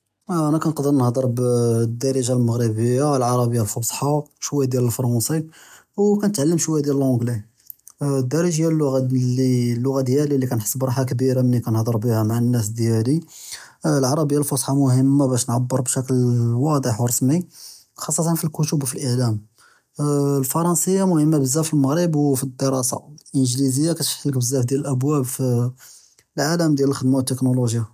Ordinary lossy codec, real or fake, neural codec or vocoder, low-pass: none; real; none; 14.4 kHz